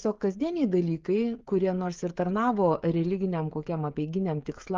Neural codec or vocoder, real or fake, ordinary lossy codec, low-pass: codec, 16 kHz, 16 kbps, FreqCodec, smaller model; fake; Opus, 32 kbps; 7.2 kHz